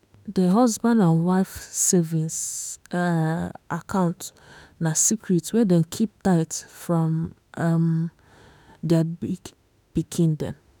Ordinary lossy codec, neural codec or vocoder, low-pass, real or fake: none; autoencoder, 48 kHz, 32 numbers a frame, DAC-VAE, trained on Japanese speech; 19.8 kHz; fake